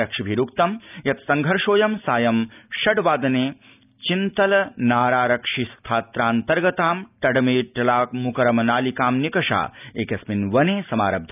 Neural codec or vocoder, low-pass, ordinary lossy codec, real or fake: none; 3.6 kHz; none; real